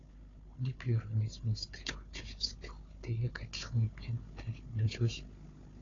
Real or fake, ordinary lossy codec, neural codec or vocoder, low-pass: fake; AAC, 32 kbps; codec, 16 kHz, 4 kbps, FunCodec, trained on Chinese and English, 50 frames a second; 7.2 kHz